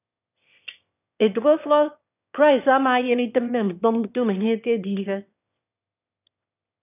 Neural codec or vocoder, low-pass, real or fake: autoencoder, 22.05 kHz, a latent of 192 numbers a frame, VITS, trained on one speaker; 3.6 kHz; fake